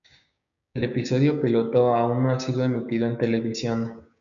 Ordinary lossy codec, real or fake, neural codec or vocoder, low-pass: MP3, 96 kbps; fake; codec, 16 kHz, 6 kbps, DAC; 7.2 kHz